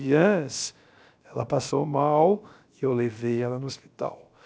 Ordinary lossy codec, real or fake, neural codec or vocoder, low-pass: none; fake; codec, 16 kHz, about 1 kbps, DyCAST, with the encoder's durations; none